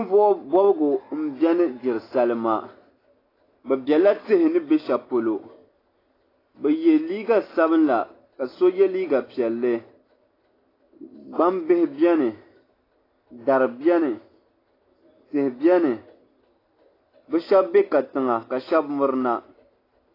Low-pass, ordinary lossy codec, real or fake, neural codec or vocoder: 5.4 kHz; AAC, 24 kbps; real; none